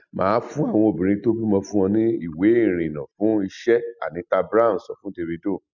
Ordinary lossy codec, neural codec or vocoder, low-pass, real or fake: none; none; 7.2 kHz; real